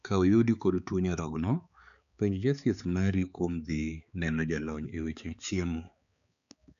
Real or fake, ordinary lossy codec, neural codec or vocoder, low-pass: fake; none; codec, 16 kHz, 4 kbps, X-Codec, HuBERT features, trained on balanced general audio; 7.2 kHz